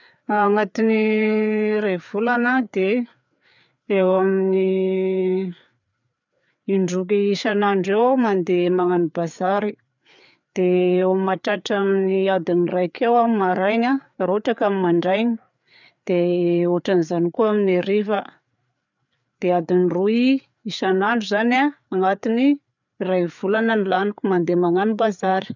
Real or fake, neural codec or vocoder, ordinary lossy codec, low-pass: fake; codec, 16 kHz, 4 kbps, FreqCodec, larger model; none; 7.2 kHz